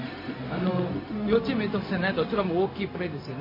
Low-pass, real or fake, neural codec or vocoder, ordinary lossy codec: 5.4 kHz; fake; codec, 16 kHz, 0.4 kbps, LongCat-Audio-Codec; MP3, 24 kbps